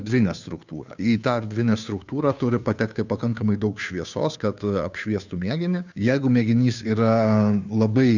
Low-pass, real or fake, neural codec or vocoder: 7.2 kHz; fake; codec, 16 kHz, 2 kbps, FunCodec, trained on Chinese and English, 25 frames a second